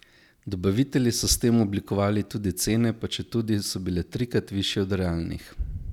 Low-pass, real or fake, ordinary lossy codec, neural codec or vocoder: 19.8 kHz; real; none; none